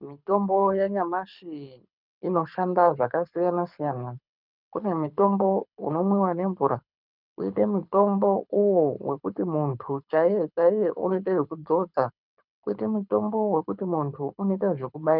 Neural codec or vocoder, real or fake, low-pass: codec, 24 kHz, 6 kbps, HILCodec; fake; 5.4 kHz